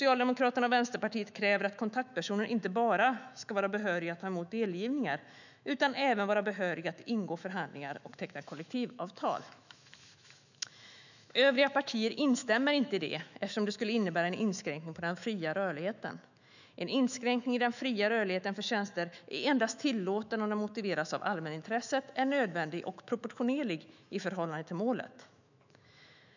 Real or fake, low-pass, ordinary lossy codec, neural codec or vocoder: fake; 7.2 kHz; none; autoencoder, 48 kHz, 128 numbers a frame, DAC-VAE, trained on Japanese speech